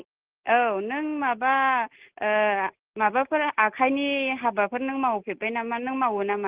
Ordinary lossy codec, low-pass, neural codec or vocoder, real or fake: Opus, 16 kbps; 3.6 kHz; none; real